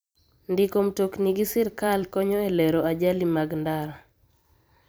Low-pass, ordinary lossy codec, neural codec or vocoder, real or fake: none; none; vocoder, 44.1 kHz, 128 mel bands every 256 samples, BigVGAN v2; fake